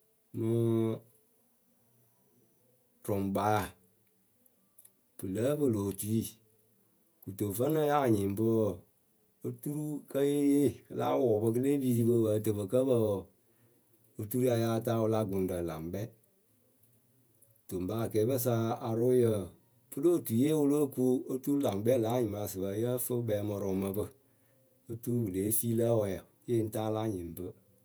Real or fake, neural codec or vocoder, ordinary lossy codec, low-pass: fake; vocoder, 48 kHz, 128 mel bands, Vocos; none; none